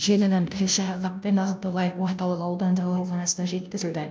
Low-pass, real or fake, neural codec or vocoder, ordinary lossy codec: none; fake; codec, 16 kHz, 0.5 kbps, FunCodec, trained on Chinese and English, 25 frames a second; none